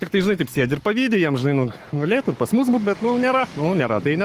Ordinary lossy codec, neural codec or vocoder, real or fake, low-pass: Opus, 24 kbps; codec, 44.1 kHz, 7.8 kbps, Pupu-Codec; fake; 14.4 kHz